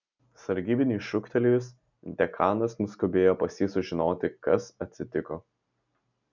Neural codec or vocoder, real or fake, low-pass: none; real; 7.2 kHz